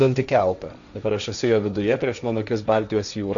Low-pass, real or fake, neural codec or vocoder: 7.2 kHz; fake; codec, 16 kHz, 1.1 kbps, Voila-Tokenizer